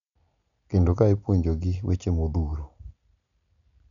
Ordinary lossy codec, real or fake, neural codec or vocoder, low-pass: none; real; none; 7.2 kHz